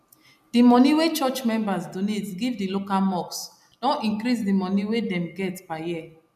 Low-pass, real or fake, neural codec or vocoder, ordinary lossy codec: 14.4 kHz; real; none; none